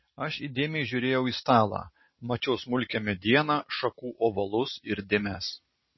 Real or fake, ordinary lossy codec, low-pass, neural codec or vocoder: real; MP3, 24 kbps; 7.2 kHz; none